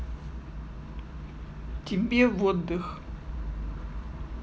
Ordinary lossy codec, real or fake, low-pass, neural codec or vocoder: none; real; none; none